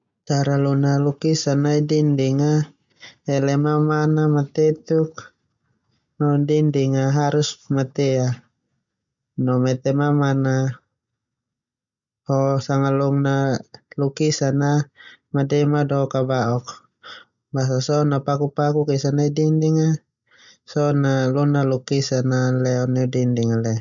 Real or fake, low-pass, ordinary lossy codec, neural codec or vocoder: real; 7.2 kHz; none; none